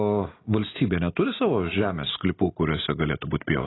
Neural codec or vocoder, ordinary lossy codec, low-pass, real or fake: none; AAC, 16 kbps; 7.2 kHz; real